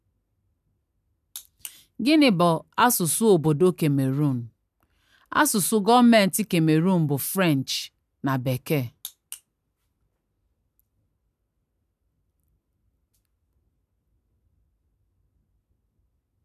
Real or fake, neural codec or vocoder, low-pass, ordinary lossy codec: real; none; 14.4 kHz; none